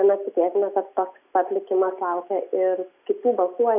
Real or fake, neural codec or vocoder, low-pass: real; none; 3.6 kHz